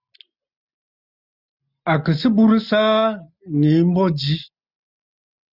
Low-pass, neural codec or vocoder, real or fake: 5.4 kHz; none; real